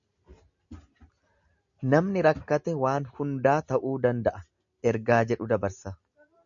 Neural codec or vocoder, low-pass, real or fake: none; 7.2 kHz; real